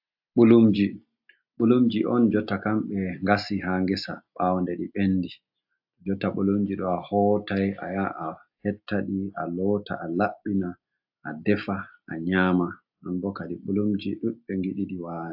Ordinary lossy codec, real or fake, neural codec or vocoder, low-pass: AAC, 48 kbps; real; none; 5.4 kHz